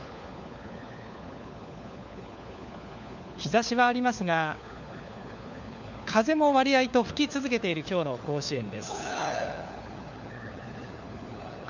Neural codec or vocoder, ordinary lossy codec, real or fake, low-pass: codec, 16 kHz, 4 kbps, FunCodec, trained on LibriTTS, 50 frames a second; none; fake; 7.2 kHz